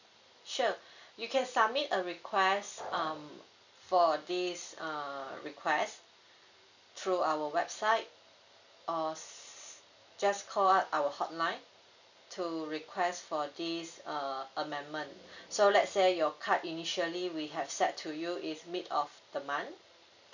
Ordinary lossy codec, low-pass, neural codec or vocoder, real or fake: none; 7.2 kHz; none; real